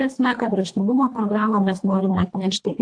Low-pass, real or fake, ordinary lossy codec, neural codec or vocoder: 9.9 kHz; fake; AAC, 48 kbps; codec, 24 kHz, 1.5 kbps, HILCodec